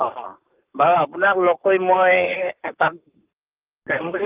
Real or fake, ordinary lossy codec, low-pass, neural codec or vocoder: fake; Opus, 24 kbps; 3.6 kHz; codec, 44.1 kHz, 7.8 kbps, Pupu-Codec